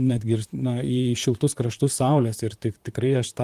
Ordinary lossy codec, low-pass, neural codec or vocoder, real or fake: Opus, 24 kbps; 14.4 kHz; vocoder, 44.1 kHz, 128 mel bands, Pupu-Vocoder; fake